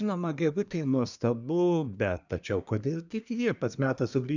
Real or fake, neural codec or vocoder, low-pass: fake; codec, 24 kHz, 1 kbps, SNAC; 7.2 kHz